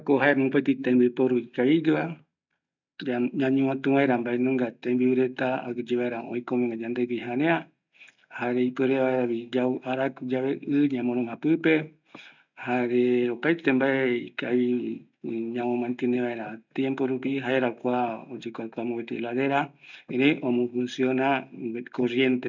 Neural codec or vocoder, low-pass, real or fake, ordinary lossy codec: none; 7.2 kHz; real; none